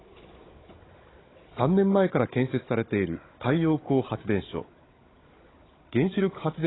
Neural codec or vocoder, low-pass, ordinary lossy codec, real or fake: codec, 16 kHz, 16 kbps, FunCodec, trained on Chinese and English, 50 frames a second; 7.2 kHz; AAC, 16 kbps; fake